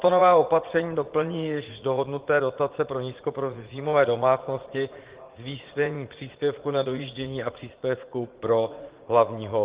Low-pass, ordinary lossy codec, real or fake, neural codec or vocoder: 3.6 kHz; Opus, 16 kbps; fake; vocoder, 44.1 kHz, 80 mel bands, Vocos